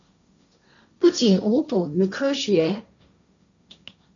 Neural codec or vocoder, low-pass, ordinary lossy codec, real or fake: codec, 16 kHz, 1.1 kbps, Voila-Tokenizer; 7.2 kHz; MP3, 96 kbps; fake